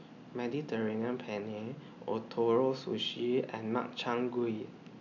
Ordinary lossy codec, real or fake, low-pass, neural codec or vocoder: none; real; 7.2 kHz; none